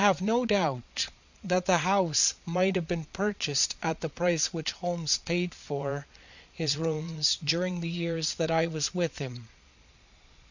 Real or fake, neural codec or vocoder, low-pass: fake; vocoder, 22.05 kHz, 80 mel bands, Vocos; 7.2 kHz